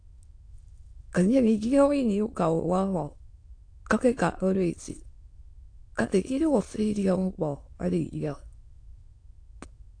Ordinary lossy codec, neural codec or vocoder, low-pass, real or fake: AAC, 48 kbps; autoencoder, 22.05 kHz, a latent of 192 numbers a frame, VITS, trained on many speakers; 9.9 kHz; fake